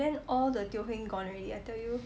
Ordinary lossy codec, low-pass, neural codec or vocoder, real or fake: none; none; none; real